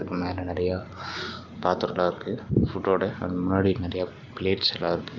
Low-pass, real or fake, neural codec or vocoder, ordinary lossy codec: 7.2 kHz; real; none; Opus, 24 kbps